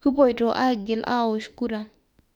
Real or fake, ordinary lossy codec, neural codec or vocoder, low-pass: fake; none; autoencoder, 48 kHz, 32 numbers a frame, DAC-VAE, trained on Japanese speech; 19.8 kHz